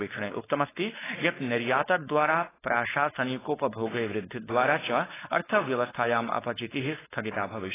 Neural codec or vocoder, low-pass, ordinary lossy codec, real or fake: codec, 16 kHz, 4.8 kbps, FACodec; 3.6 kHz; AAC, 16 kbps; fake